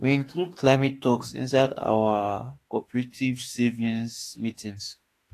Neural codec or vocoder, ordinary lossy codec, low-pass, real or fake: autoencoder, 48 kHz, 32 numbers a frame, DAC-VAE, trained on Japanese speech; AAC, 48 kbps; 14.4 kHz; fake